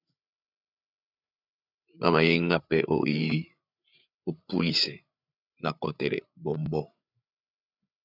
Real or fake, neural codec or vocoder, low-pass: fake; codec, 16 kHz, 8 kbps, FreqCodec, larger model; 5.4 kHz